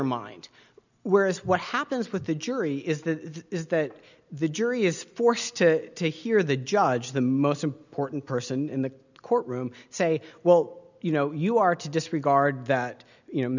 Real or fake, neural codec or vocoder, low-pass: real; none; 7.2 kHz